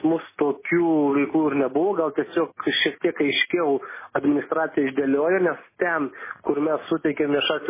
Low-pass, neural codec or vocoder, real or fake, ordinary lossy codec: 3.6 kHz; none; real; MP3, 16 kbps